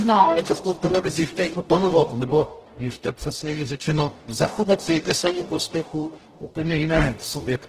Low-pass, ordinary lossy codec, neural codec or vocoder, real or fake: 14.4 kHz; Opus, 16 kbps; codec, 44.1 kHz, 0.9 kbps, DAC; fake